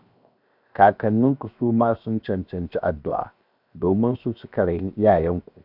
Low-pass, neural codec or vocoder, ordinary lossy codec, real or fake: 5.4 kHz; codec, 16 kHz, 0.7 kbps, FocalCodec; none; fake